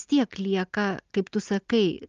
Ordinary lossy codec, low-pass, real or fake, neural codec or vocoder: Opus, 32 kbps; 7.2 kHz; real; none